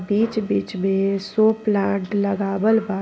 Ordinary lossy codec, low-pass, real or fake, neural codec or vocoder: none; none; real; none